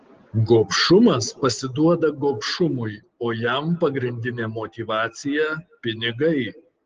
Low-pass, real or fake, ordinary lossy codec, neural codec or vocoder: 7.2 kHz; real; Opus, 16 kbps; none